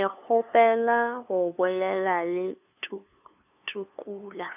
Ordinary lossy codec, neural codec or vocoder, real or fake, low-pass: none; codec, 16 kHz, 2 kbps, FunCodec, trained on LibriTTS, 25 frames a second; fake; 3.6 kHz